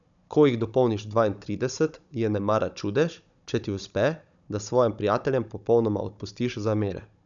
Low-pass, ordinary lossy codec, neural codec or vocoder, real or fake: 7.2 kHz; none; codec, 16 kHz, 16 kbps, FunCodec, trained on Chinese and English, 50 frames a second; fake